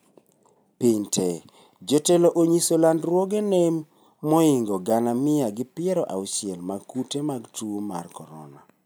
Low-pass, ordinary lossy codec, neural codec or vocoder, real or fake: none; none; none; real